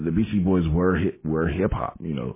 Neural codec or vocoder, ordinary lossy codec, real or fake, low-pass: none; MP3, 16 kbps; real; 3.6 kHz